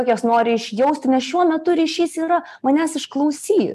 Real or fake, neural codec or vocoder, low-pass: real; none; 14.4 kHz